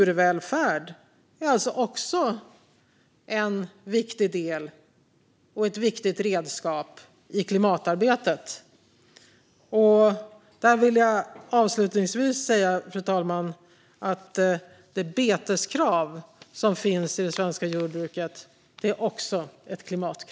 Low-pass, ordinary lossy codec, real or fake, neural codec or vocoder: none; none; real; none